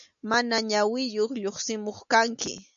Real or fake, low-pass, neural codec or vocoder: real; 7.2 kHz; none